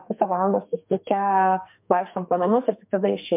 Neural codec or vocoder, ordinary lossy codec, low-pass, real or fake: codec, 44.1 kHz, 2.6 kbps, SNAC; MP3, 32 kbps; 3.6 kHz; fake